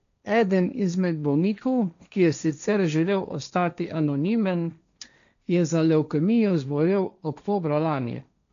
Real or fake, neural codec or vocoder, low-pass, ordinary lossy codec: fake; codec, 16 kHz, 1.1 kbps, Voila-Tokenizer; 7.2 kHz; none